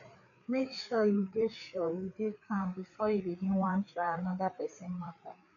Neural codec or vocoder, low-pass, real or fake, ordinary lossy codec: codec, 16 kHz, 4 kbps, FreqCodec, larger model; 7.2 kHz; fake; none